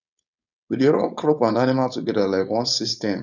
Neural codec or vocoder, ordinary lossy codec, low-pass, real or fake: codec, 16 kHz, 4.8 kbps, FACodec; none; 7.2 kHz; fake